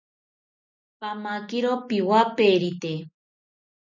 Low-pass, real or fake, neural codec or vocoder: 7.2 kHz; real; none